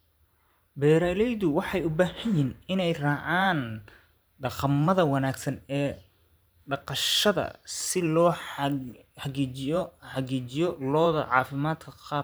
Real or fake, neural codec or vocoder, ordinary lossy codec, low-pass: fake; vocoder, 44.1 kHz, 128 mel bands every 512 samples, BigVGAN v2; none; none